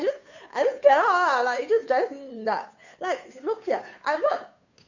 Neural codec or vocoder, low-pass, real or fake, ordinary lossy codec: codec, 16 kHz, 2 kbps, FunCodec, trained on Chinese and English, 25 frames a second; 7.2 kHz; fake; none